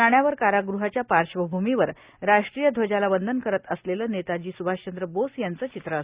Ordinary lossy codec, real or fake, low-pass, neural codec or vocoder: Opus, 64 kbps; real; 3.6 kHz; none